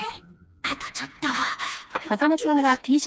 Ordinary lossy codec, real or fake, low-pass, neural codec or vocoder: none; fake; none; codec, 16 kHz, 2 kbps, FreqCodec, smaller model